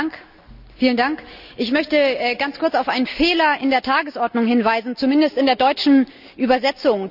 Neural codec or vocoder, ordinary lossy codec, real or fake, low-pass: none; none; real; 5.4 kHz